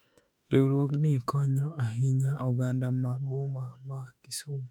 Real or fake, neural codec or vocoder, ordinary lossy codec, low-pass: fake; autoencoder, 48 kHz, 32 numbers a frame, DAC-VAE, trained on Japanese speech; none; 19.8 kHz